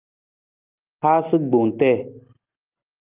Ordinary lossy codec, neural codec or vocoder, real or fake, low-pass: Opus, 24 kbps; none; real; 3.6 kHz